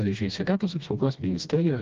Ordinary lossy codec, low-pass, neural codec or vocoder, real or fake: Opus, 32 kbps; 7.2 kHz; codec, 16 kHz, 1 kbps, FreqCodec, smaller model; fake